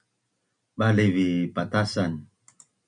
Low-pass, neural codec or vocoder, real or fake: 9.9 kHz; none; real